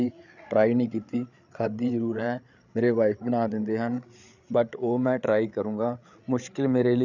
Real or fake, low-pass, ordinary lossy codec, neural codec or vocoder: fake; 7.2 kHz; none; codec, 16 kHz, 8 kbps, FreqCodec, larger model